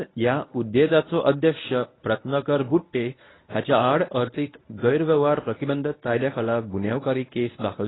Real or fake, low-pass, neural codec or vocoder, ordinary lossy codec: fake; 7.2 kHz; codec, 24 kHz, 0.9 kbps, WavTokenizer, medium speech release version 1; AAC, 16 kbps